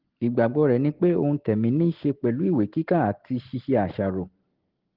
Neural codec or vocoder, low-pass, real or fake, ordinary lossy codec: none; 5.4 kHz; real; Opus, 16 kbps